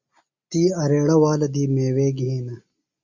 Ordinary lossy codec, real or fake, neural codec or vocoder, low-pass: Opus, 64 kbps; real; none; 7.2 kHz